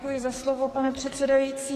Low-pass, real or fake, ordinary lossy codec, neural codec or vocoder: 14.4 kHz; fake; AAC, 48 kbps; codec, 44.1 kHz, 2.6 kbps, SNAC